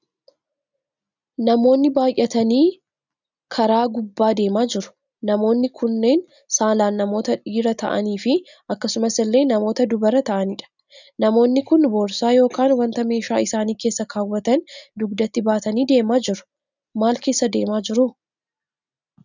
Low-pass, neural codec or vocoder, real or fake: 7.2 kHz; none; real